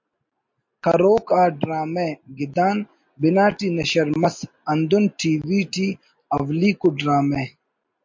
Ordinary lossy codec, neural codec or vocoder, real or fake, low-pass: MP3, 48 kbps; none; real; 7.2 kHz